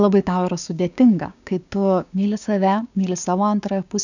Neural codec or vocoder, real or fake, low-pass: codec, 16 kHz, 6 kbps, DAC; fake; 7.2 kHz